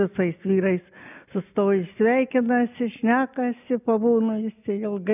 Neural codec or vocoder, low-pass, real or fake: none; 3.6 kHz; real